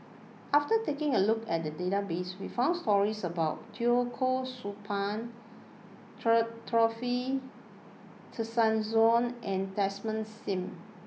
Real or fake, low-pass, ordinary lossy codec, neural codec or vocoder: real; none; none; none